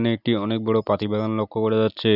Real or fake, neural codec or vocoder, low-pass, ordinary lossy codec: real; none; 5.4 kHz; none